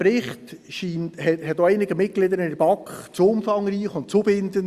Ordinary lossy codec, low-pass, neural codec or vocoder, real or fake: Opus, 64 kbps; 14.4 kHz; none; real